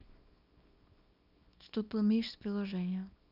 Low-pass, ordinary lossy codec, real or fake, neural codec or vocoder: 5.4 kHz; none; fake; codec, 24 kHz, 0.9 kbps, WavTokenizer, small release